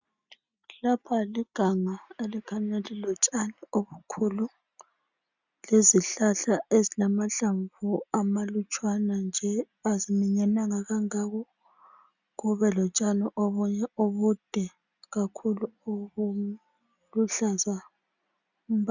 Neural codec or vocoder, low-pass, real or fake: none; 7.2 kHz; real